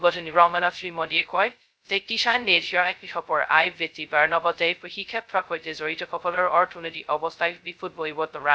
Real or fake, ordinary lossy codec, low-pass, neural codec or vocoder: fake; none; none; codec, 16 kHz, 0.2 kbps, FocalCodec